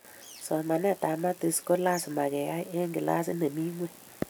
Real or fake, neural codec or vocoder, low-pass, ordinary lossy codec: real; none; none; none